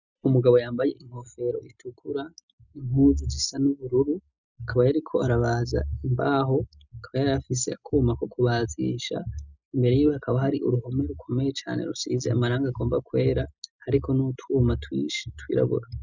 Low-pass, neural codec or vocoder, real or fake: 7.2 kHz; none; real